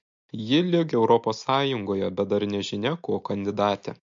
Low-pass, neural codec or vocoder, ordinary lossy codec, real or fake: 7.2 kHz; none; MP3, 48 kbps; real